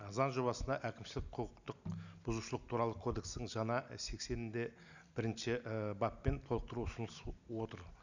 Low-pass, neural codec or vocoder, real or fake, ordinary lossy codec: 7.2 kHz; none; real; none